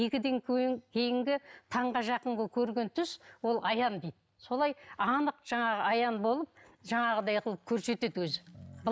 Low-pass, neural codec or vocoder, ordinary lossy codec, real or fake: none; none; none; real